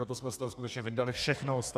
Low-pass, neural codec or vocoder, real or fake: 14.4 kHz; codec, 32 kHz, 1.9 kbps, SNAC; fake